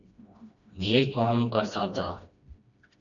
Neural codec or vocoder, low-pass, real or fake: codec, 16 kHz, 1 kbps, FreqCodec, smaller model; 7.2 kHz; fake